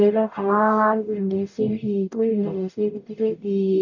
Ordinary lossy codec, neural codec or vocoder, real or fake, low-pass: none; codec, 44.1 kHz, 0.9 kbps, DAC; fake; 7.2 kHz